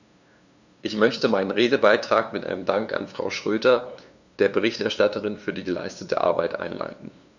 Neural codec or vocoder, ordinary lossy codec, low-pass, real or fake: codec, 16 kHz, 2 kbps, FunCodec, trained on LibriTTS, 25 frames a second; none; 7.2 kHz; fake